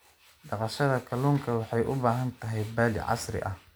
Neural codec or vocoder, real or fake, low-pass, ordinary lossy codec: none; real; none; none